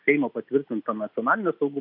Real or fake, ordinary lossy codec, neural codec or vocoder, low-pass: real; AAC, 48 kbps; none; 5.4 kHz